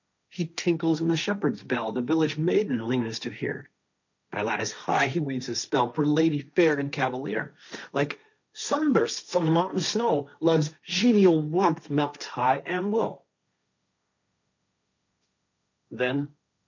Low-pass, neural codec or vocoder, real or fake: 7.2 kHz; codec, 16 kHz, 1.1 kbps, Voila-Tokenizer; fake